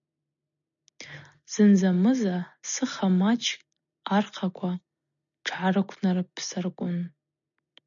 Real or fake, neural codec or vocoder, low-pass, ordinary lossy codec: real; none; 7.2 kHz; MP3, 96 kbps